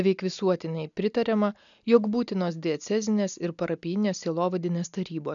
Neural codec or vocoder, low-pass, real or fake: none; 7.2 kHz; real